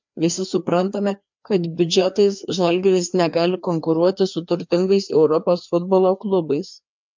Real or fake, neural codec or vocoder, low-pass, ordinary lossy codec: fake; codec, 16 kHz, 2 kbps, FreqCodec, larger model; 7.2 kHz; MP3, 64 kbps